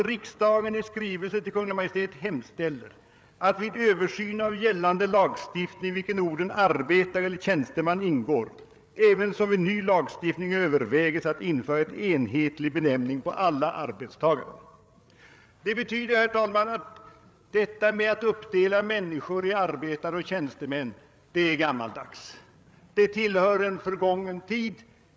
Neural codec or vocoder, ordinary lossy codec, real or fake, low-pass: codec, 16 kHz, 8 kbps, FreqCodec, larger model; none; fake; none